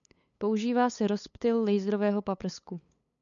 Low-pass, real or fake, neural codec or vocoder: 7.2 kHz; fake; codec, 16 kHz, 8 kbps, FunCodec, trained on LibriTTS, 25 frames a second